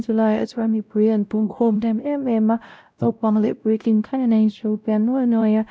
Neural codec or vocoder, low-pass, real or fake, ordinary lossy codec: codec, 16 kHz, 0.5 kbps, X-Codec, WavLM features, trained on Multilingual LibriSpeech; none; fake; none